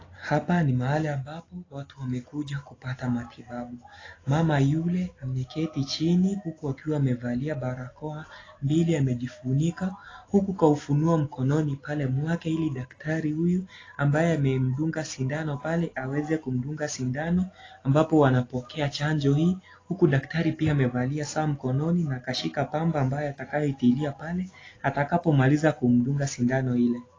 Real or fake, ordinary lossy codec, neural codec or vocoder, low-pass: real; AAC, 32 kbps; none; 7.2 kHz